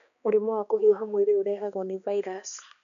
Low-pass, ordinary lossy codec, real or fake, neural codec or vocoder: 7.2 kHz; none; fake; codec, 16 kHz, 2 kbps, X-Codec, HuBERT features, trained on balanced general audio